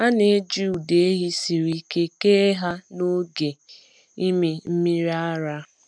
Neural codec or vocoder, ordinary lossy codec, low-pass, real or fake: none; none; 9.9 kHz; real